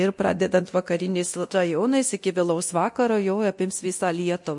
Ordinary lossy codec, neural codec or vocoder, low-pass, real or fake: MP3, 48 kbps; codec, 24 kHz, 0.9 kbps, DualCodec; 10.8 kHz; fake